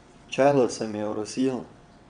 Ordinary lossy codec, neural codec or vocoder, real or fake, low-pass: none; vocoder, 22.05 kHz, 80 mel bands, WaveNeXt; fake; 9.9 kHz